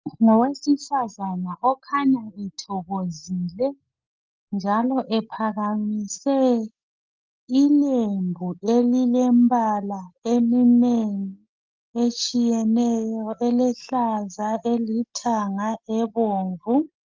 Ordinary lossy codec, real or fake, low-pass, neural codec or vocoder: Opus, 32 kbps; real; 7.2 kHz; none